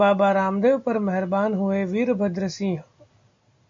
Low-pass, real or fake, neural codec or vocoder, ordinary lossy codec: 7.2 kHz; real; none; MP3, 48 kbps